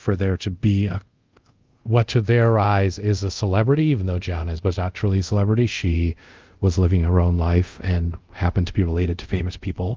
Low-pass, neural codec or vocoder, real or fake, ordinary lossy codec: 7.2 kHz; codec, 24 kHz, 0.5 kbps, DualCodec; fake; Opus, 24 kbps